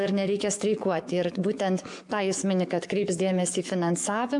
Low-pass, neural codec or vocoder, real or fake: 10.8 kHz; codec, 44.1 kHz, 7.8 kbps, DAC; fake